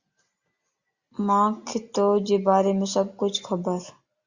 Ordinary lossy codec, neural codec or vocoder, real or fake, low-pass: Opus, 64 kbps; none; real; 7.2 kHz